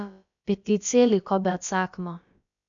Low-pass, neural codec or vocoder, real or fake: 7.2 kHz; codec, 16 kHz, about 1 kbps, DyCAST, with the encoder's durations; fake